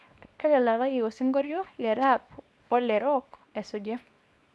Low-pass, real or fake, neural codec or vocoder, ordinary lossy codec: none; fake; codec, 24 kHz, 0.9 kbps, WavTokenizer, small release; none